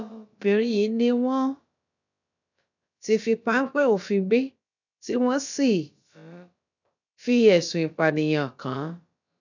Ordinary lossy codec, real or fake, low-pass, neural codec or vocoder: none; fake; 7.2 kHz; codec, 16 kHz, about 1 kbps, DyCAST, with the encoder's durations